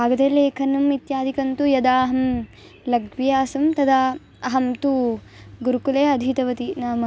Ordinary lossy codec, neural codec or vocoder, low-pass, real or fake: none; none; none; real